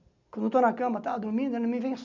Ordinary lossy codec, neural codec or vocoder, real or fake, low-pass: none; none; real; 7.2 kHz